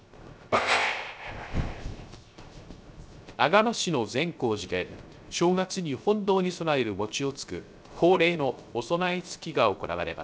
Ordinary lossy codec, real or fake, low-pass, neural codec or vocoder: none; fake; none; codec, 16 kHz, 0.3 kbps, FocalCodec